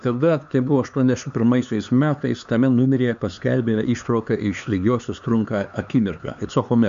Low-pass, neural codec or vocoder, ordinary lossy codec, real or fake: 7.2 kHz; codec, 16 kHz, 4 kbps, X-Codec, HuBERT features, trained on LibriSpeech; MP3, 64 kbps; fake